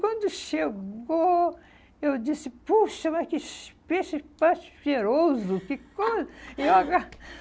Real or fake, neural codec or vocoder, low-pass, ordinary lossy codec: real; none; none; none